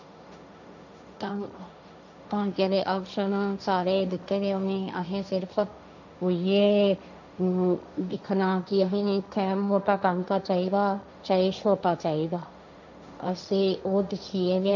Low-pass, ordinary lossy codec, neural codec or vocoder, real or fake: 7.2 kHz; none; codec, 16 kHz, 1.1 kbps, Voila-Tokenizer; fake